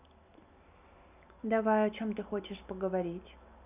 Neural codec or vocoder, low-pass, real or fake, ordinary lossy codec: none; 3.6 kHz; real; none